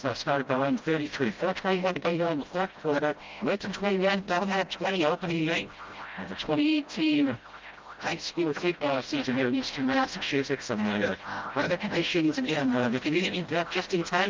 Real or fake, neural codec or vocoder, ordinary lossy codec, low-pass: fake; codec, 16 kHz, 0.5 kbps, FreqCodec, smaller model; Opus, 24 kbps; 7.2 kHz